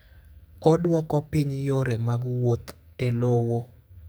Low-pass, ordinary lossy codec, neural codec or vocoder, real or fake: none; none; codec, 44.1 kHz, 2.6 kbps, SNAC; fake